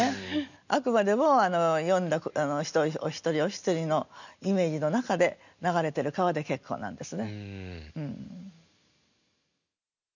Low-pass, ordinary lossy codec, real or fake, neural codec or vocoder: 7.2 kHz; none; real; none